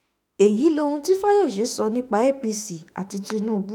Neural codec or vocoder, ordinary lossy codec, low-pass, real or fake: autoencoder, 48 kHz, 32 numbers a frame, DAC-VAE, trained on Japanese speech; none; 19.8 kHz; fake